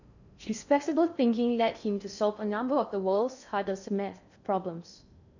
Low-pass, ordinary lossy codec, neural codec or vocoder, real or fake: 7.2 kHz; none; codec, 16 kHz in and 24 kHz out, 0.6 kbps, FocalCodec, streaming, 4096 codes; fake